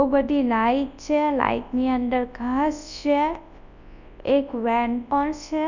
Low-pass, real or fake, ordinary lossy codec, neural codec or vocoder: 7.2 kHz; fake; none; codec, 24 kHz, 0.9 kbps, WavTokenizer, large speech release